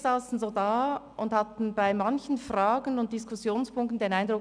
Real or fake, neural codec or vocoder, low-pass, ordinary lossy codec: real; none; 9.9 kHz; none